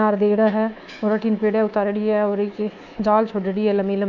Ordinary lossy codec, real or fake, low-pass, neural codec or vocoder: none; fake; 7.2 kHz; codec, 24 kHz, 3.1 kbps, DualCodec